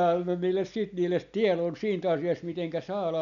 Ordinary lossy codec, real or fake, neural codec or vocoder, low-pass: none; real; none; 7.2 kHz